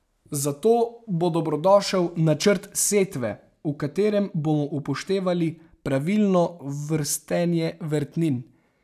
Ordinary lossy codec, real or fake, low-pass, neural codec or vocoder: none; real; 14.4 kHz; none